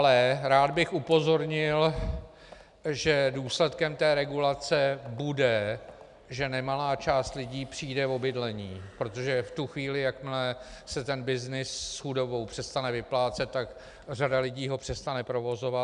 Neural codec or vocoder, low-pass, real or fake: none; 10.8 kHz; real